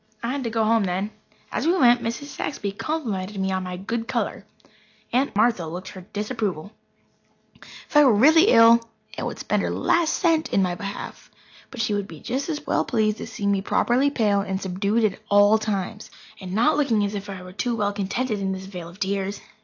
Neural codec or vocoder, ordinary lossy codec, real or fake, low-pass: none; AAC, 48 kbps; real; 7.2 kHz